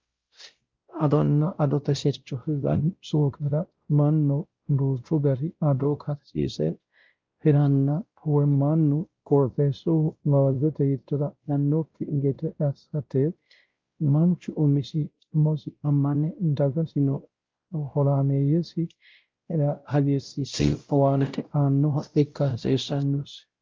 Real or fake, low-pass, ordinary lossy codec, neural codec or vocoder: fake; 7.2 kHz; Opus, 24 kbps; codec, 16 kHz, 0.5 kbps, X-Codec, WavLM features, trained on Multilingual LibriSpeech